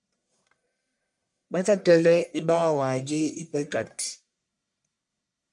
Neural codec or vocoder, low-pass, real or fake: codec, 44.1 kHz, 1.7 kbps, Pupu-Codec; 10.8 kHz; fake